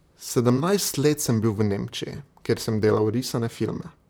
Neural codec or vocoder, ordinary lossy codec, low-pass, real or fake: vocoder, 44.1 kHz, 128 mel bands, Pupu-Vocoder; none; none; fake